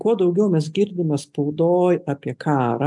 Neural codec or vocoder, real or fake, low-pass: none; real; 10.8 kHz